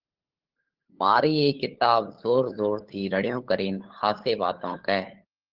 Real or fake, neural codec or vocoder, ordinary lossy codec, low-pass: fake; codec, 16 kHz, 8 kbps, FunCodec, trained on LibriTTS, 25 frames a second; Opus, 16 kbps; 5.4 kHz